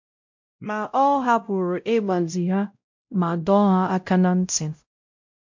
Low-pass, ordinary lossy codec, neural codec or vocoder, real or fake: 7.2 kHz; MP3, 48 kbps; codec, 16 kHz, 0.5 kbps, X-Codec, WavLM features, trained on Multilingual LibriSpeech; fake